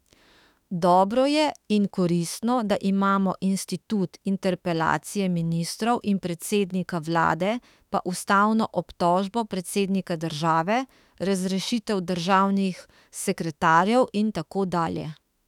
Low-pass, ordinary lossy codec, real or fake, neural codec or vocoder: 19.8 kHz; none; fake; autoencoder, 48 kHz, 32 numbers a frame, DAC-VAE, trained on Japanese speech